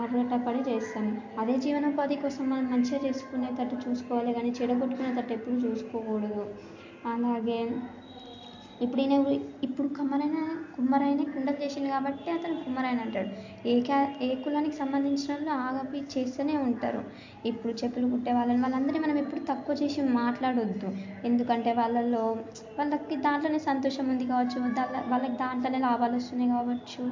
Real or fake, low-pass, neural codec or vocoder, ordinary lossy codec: real; 7.2 kHz; none; MP3, 48 kbps